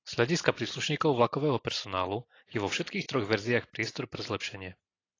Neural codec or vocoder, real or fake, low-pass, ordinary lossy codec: none; real; 7.2 kHz; AAC, 32 kbps